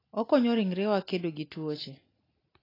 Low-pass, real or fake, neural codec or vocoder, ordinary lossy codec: 5.4 kHz; real; none; AAC, 24 kbps